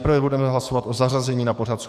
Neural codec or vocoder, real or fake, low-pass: codec, 44.1 kHz, 7.8 kbps, DAC; fake; 14.4 kHz